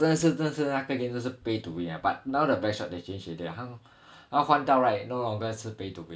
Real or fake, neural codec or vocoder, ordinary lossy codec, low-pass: real; none; none; none